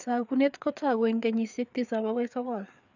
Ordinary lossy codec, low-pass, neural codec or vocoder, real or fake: none; 7.2 kHz; codec, 16 kHz, 4 kbps, FreqCodec, larger model; fake